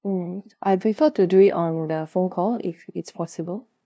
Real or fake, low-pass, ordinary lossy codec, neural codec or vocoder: fake; none; none; codec, 16 kHz, 0.5 kbps, FunCodec, trained on LibriTTS, 25 frames a second